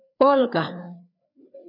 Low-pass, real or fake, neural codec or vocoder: 5.4 kHz; fake; codec, 16 kHz, 4 kbps, FreqCodec, larger model